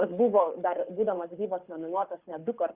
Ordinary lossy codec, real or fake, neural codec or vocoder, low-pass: Opus, 64 kbps; fake; codec, 24 kHz, 6 kbps, HILCodec; 3.6 kHz